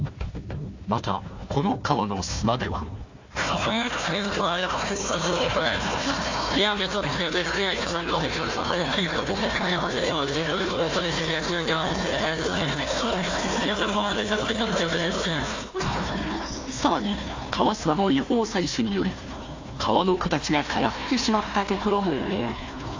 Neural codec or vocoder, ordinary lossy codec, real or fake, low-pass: codec, 16 kHz, 1 kbps, FunCodec, trained on Chinese and English, 50 frames a second; AAC, 48 kbps; fake; 7.2 kHz